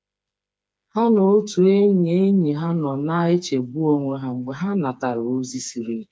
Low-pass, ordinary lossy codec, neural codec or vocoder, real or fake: none; none; codec, 16 kHz, 4 kbps, FreqCodec, smaller model; fake